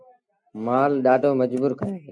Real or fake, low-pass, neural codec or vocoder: real; 9.9 kHz; none